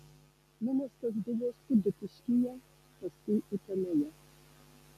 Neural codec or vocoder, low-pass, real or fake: none; 14.4 kHz; real